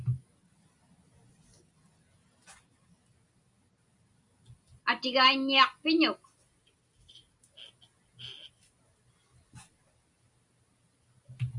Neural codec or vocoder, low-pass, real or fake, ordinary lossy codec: none; 10.8 kHz; real; Opus, 64 kbps